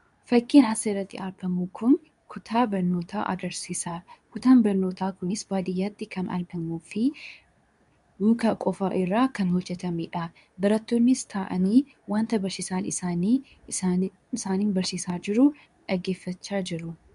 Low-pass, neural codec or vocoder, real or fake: 10.8 kHz; codec, 24 kHz, 0.9 kbps, WavTokenizer, medium speech release version 2; fake